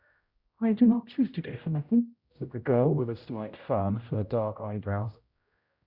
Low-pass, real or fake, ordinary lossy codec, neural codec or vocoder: 5.4 kHz; fake; Opus, 64 kbps; codec, 16 kHz, 0.5 kbps, X-Codec, HuBERT features, trained on general audio